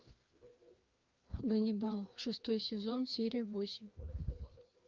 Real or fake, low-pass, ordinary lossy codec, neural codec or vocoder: fake; 7.2 kHz; Opus, 32 kbps; codec, 16 kHz, 2 kbps, FreqCodec, larger model